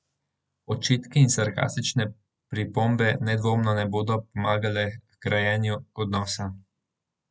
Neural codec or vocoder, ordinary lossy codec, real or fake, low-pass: none; none; real; none